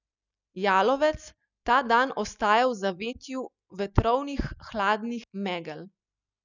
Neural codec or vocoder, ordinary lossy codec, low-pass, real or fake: none; none; 7.2 kHz; real